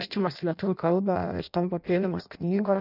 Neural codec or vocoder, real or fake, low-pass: codec, 16 kHz in and 24 kHz out, 0.6 kbps, FireRedTTS-2 codec; fake; 5.4 kHz